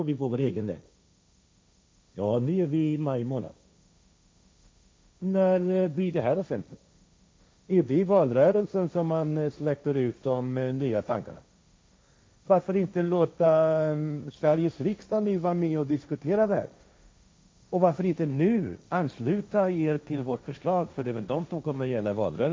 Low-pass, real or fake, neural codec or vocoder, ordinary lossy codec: none; fake; codec, 16 kHz, 1.1 kbps, Voila-Tokenizer; none